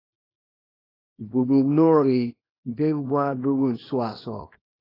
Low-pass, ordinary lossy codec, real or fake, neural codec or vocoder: 5.4 kHz; AAC, 24 kbps; fake; codec, 24 kHz, 0.9 kbps, WavTokenizer, small release